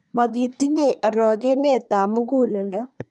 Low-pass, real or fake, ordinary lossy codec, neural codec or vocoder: 10.8 kHz; fake; none; codec, 24 kHz, 1 kbps, SNAC